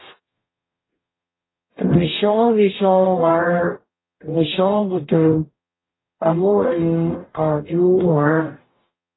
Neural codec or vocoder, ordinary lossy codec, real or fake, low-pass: codec, 44.1 kHz, 0.9 kbps, DAC; AAC, 16 kbps; fake; 7.2 kHz